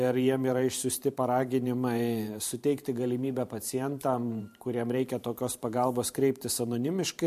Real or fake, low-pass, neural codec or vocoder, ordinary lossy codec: fake; 14.4 kHz; vocoder, 44.1 kHz, 128 mel bands every 512 samples, BigVGAN v2; MP3, 64 kbps